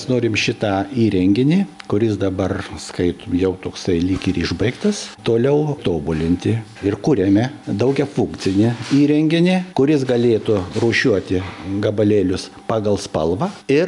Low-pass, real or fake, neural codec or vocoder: 10.8 kHz; real; none